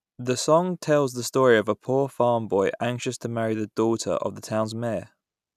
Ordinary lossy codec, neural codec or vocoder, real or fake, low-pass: none; none; real; 14.4 kHz